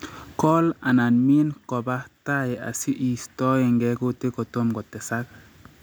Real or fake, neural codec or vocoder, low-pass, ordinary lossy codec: real; none; none; none